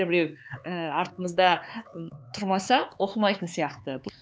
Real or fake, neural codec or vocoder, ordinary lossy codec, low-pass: fake; codec, 16 kHz, 4 kbps, X-Codec, HuBERT features, trained on LibriSpeech; none; none